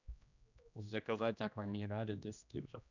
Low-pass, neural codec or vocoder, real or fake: 7.2 kHz; codec, 16 kHz, 1 kbps, X-Codec, HuBERT features, trained on general audio; fake